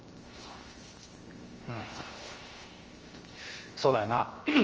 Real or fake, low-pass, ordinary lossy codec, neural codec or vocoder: fake; 7.2 kHz; Opus, 24 kbps; codec, 16 kHz, 0.7 kbps, FocalCodec